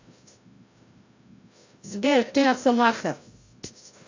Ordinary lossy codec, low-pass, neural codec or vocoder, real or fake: AAC, 48 kbps; 7.2 kHz; codec, 16 kHz, 0.5 kbps, FreqCodec, larger model; fake